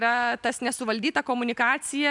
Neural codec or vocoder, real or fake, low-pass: none; real; 10.8 kHz